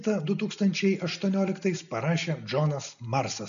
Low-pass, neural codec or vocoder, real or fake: 7.2 kHz; none; real